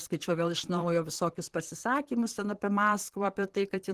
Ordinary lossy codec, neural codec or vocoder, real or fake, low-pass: Opus, 16 kbps; vocoder, 44.1 kHz, 128 mel bands, Pupu-Vocoder; fake; 14.4 kHz